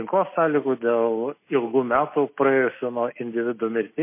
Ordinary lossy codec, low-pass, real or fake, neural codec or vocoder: MP3, 24 kbps; 3.6 kHz; real; none